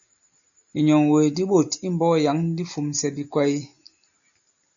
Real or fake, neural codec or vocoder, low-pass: real; none; 7.2 kHz